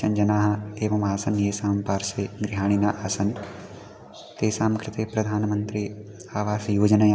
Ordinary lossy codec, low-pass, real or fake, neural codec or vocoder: none; none; real; none